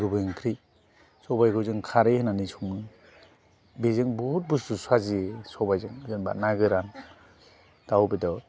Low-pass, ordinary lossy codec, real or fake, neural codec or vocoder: none; none; real; none